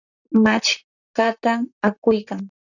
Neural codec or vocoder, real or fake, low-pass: vocoder, 44.1 kHz, 128 mel bands, Pupu-Vocoder; fake; 7.2 kHz